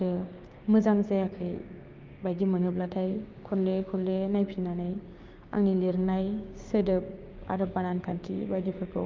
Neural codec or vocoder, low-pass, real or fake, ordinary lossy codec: codec, 16 kHz, 6 kbps, DAC; 7.2 kHz; fake; Opus, 32 kbps